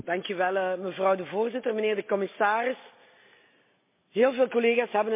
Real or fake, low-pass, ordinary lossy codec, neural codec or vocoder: real; 3.6 kHz; MP3, 24 kbps; none